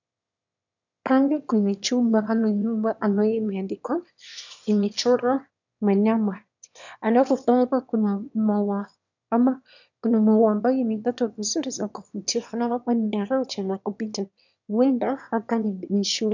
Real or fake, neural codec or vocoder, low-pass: fake; autoencoder, 22.05 kHz, a latent of 192 numbers a frame, VITS, trained on one speaker; 7.2 kHz